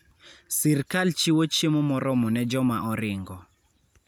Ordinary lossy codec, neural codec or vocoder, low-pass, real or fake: none; none; none; real